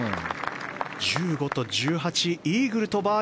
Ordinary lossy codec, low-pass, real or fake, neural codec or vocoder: none; none; real; none